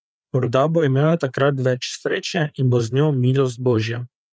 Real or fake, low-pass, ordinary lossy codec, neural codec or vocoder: fake; none; none; codec, 16 kHz, 4 kbps, FreqCodec, larger model